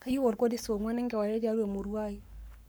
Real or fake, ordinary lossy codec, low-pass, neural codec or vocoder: fake; none; none; codec, 44.1 kHz, 7.8 kbps, Pupu-Codec